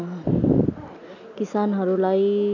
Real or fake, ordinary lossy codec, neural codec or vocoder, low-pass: real; none; none; 7.2 kHz